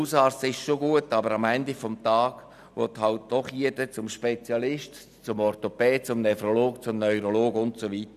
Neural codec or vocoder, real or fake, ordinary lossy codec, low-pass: none; real; none; 14.4 kHz